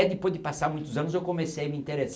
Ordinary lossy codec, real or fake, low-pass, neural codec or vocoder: none; real; none; none